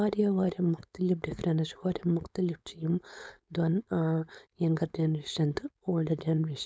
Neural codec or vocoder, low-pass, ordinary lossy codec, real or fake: codec, 16 kHz, 4.8 kbps, FACodec; none; none; fake